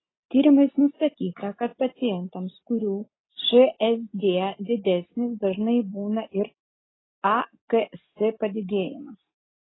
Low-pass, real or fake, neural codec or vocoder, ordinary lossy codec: 7.2 kHz; real; none; AAC, 16 kbps